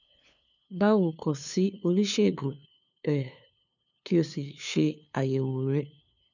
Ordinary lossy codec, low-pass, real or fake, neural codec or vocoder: none; 7.2 kHz; fake; codec, 16 kHz, 2 kbps, FunCodec, trained on LibriTTS, 25 frames a second